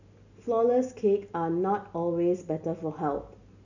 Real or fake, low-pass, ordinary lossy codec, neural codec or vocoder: real; 7.2 kHz; none; none